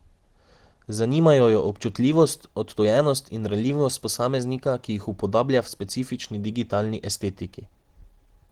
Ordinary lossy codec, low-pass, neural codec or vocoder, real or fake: Opus, 16 kbps; 19.8 kHz; none; real